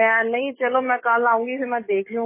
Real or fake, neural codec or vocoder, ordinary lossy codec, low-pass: fake; codec, 44.1 kHz, 7.8 kbps, DAC; MP3, 16 kbps; 3.6 kHz